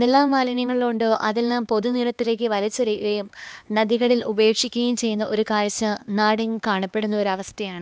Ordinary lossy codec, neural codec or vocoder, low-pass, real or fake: none; codec, 16 kHz, 2 kbps, X-Codec, HuBERT features, trained on LibriSpeech; none; fake